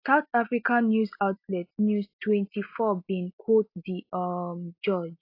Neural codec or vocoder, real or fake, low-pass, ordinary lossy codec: none; real; 5.4 kHz; AAC, 32 kbps